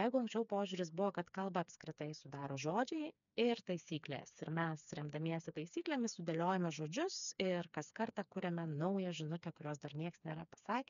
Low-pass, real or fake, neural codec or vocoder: 7.2 kHz; fake; codec, 16 kHz, 4 kbps, FreqCodec, smaller model